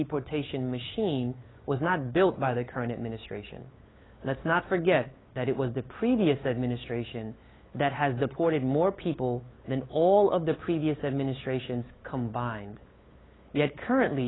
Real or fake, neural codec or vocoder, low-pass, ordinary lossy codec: fake; codec, 16 kHz, 8 kbps, FunCodec, trained on LibriTTS, 25 frames a second; 7.2 kHz; AAC, 16 kbps